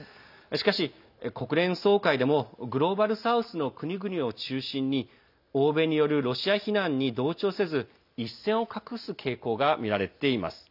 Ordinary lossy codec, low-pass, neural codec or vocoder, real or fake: none; 5.4 kHz; none; real